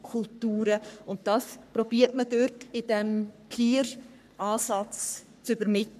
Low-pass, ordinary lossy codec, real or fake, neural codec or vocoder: 14.4 kHz; none; fake; codec, 44.1 kHz, 3.4 kbps, Pupu-Codec